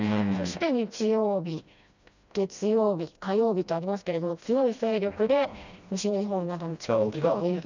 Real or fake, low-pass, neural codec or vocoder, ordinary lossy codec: fake; 7.2 kHz; codec, 16 kHz, 1 kbps, FreqCodec, smaller model; none